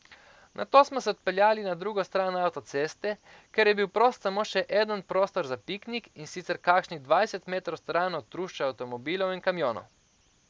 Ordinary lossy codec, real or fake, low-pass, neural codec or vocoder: none; real; none; none